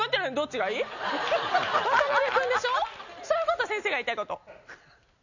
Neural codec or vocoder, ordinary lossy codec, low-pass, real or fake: none; none; 7.2 kHz; real